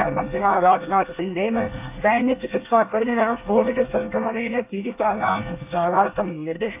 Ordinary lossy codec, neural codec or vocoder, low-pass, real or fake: AAC, 32 kbps; codec, 24 kHz, 1 kbps, SNAC; 3.6 kHz; fake